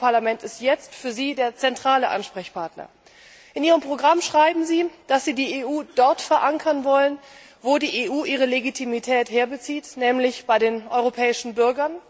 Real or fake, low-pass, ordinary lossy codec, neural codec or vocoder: real; none; none; none